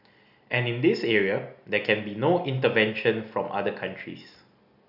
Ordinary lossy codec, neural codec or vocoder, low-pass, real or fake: none; none; 5.4 kHz; real